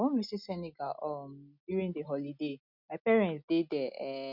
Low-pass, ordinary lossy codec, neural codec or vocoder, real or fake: 5.4 kHz; none; none; real